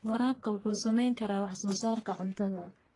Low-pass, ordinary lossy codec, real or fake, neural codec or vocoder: 10.8 kHz; AAC, 32 kbps; fake; codec, 44.1 kHz, 1.7 kbps, Pupu-Codec